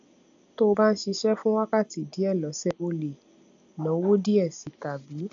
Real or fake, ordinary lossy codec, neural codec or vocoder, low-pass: real; AAC, 64 kbps; none; 7.2 kHz